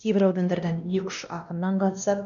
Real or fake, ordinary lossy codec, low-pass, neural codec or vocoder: fake; none; 7.2 kHz; codec, 16 kHz, 1 kbps, X-Codec, WavLM features, trained on Multilingual LibriSpeech